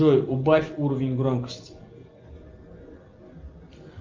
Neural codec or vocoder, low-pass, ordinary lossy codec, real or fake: none; 7.2 kHz; Opus, 24 kbps; real